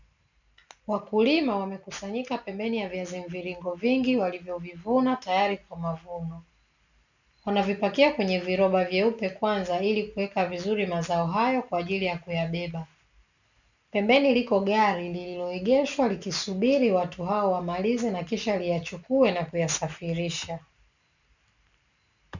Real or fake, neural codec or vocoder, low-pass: real; none; 7.2 kHz